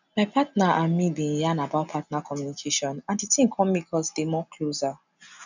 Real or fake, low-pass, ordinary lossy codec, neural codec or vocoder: real; 7.2 kHz; none; none